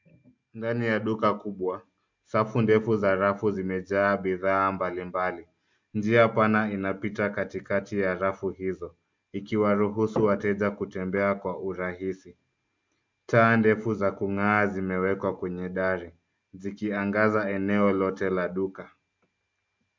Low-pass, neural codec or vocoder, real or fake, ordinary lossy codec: 7.2 kHz; none; real; MP3, 64 kbps